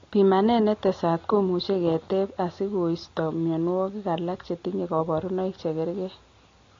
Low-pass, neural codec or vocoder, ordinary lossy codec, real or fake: 7.2 kHz; none; AAC, 32 kbps; real